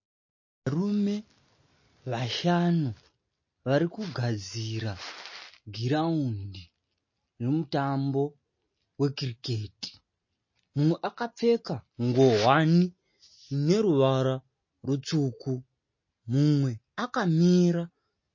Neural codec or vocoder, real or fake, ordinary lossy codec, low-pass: none; real; MP3, 32 kbps; 7.2 kHz